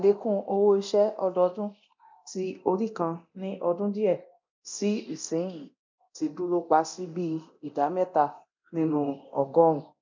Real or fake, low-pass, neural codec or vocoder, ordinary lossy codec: fake; 7.2 kHz; codec, 24 kHz, 0.9 kbps, DualCodec; MP3, 64 kbps